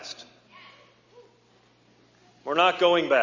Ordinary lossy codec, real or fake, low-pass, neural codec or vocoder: Opus, 64 kbps; real; 7.2 kHz; none